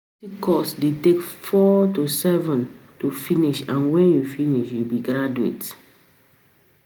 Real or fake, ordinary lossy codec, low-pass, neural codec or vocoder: real; none; none; none